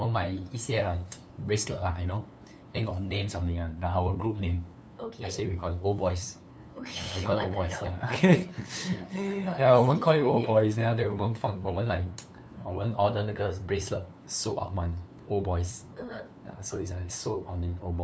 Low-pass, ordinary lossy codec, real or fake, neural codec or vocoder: none; none; fake; codec, 16 kHz, 2 kbps, FunCodec, trained on LibriTTS, 25 frames a second